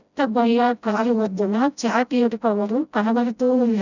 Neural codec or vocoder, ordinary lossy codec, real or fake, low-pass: codec, 16 kHz, 0.5 kbps, FreqCodec, smaller model; none; fake; 7.2 kHz